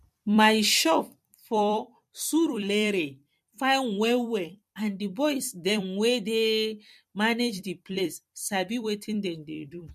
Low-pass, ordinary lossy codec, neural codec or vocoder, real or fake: 14.4 kHz; MP3, 64 kbps; vocoder, 44.1 kHz, 128 mel bands every 256 samples, BigVGAN v2; fake